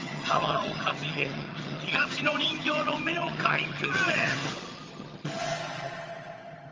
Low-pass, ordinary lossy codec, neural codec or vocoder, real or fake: 7.2 kHz; Opus, 24 kbps; vocoder, 22.05 kHz, 80 mel bands, HiFi-GAN; fake